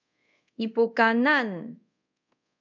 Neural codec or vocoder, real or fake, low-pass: codec, 24 kHz, 0.5 kbps, DualCodec; fake; 7.2 kHz